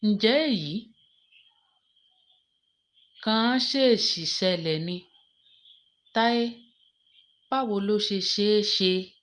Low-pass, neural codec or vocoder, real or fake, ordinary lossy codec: 9.9 kHz; none; real; Opus, 32 kbps